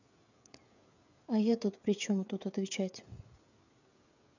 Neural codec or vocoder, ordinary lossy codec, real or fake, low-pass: codec, 16 kHz, 16 kbps, FreqCodec, smaller model; none; fake; 7.2 kHz